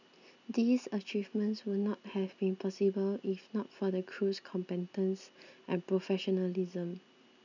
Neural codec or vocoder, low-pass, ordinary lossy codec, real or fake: none; 7.2 kHz; none; real